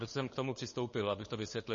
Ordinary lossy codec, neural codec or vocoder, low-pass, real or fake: MP3, 32 kbps; codec, 16 kHz, 8 kbps, FunCodec, trained on LibriTTS, 25 frames a second; 7.2 kHz; fake